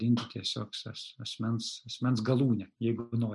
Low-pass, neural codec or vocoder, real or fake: 10.8 kHz; none; real